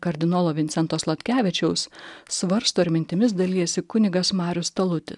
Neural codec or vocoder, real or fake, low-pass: none; real; 10.8 kHz